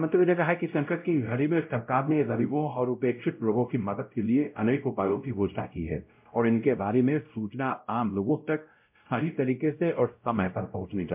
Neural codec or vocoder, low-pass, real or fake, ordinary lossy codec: codec, 16 kHz, 0.5 kbps, X-Codec, WavLM features, trained on Multilingual LibriSpeech; 3.6 kHz; fake; MP3, 32 kbps